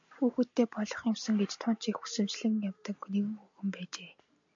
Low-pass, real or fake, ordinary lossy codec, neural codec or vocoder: 7.2 kHz; real; MP3, 64 kbps; none